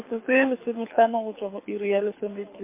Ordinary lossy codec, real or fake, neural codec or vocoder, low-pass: MP3, 24 kbps; fake; codec, 24 kHz, 6 kbps, HILCodec; 3.6 kHz